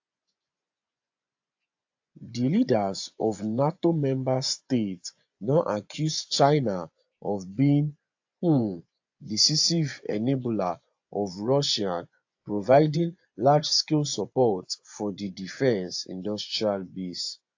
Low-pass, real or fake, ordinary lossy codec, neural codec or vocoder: 7.2 kHz; fake; AAC, 48 kbps; vocoder, 24 kHz, 100 mel bands, Vocos